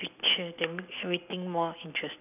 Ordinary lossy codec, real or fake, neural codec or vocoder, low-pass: none; real; none; 3.6 kHz